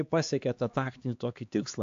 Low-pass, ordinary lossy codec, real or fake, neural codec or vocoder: 7.2 kHz; AAC, 48 kbps; fake; codec, 16 kHz, 2 kbps, X-Codec, HuBERT features, trained on LibriSpeech